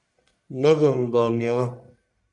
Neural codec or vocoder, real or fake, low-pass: codec, 44.1 kHz, 1.7 kbps, Pupu-Codec; fake; 10.8 kHz